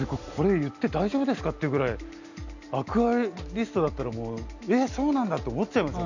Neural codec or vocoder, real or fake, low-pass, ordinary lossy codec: none; real; 7.2 kHz; none